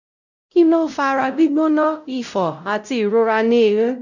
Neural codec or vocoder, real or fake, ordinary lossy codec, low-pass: codec, 16 kHz, 0.5 kbps, X-Codec, WavLM features, trained on Multilingual LibriSpeech; fake; none; 7.2 kHz